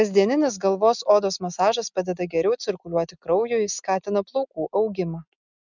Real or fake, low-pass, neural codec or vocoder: real; 7.2 kHz; none